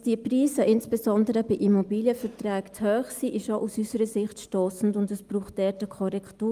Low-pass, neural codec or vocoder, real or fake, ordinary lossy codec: 14.4 kHz; none; real; Opus, 32 kbps